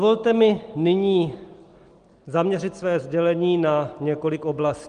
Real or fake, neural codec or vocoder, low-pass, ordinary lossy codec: real; none; 9.9 kHz; Opus, 24 kbps